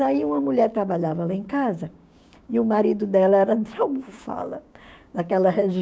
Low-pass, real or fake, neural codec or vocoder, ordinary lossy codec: none; fake; codec, 16 kHz, 6 kbps, DAC; none